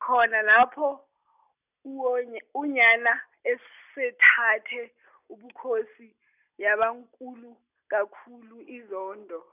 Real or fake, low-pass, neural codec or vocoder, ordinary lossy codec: real; 3.6 kHz; none; none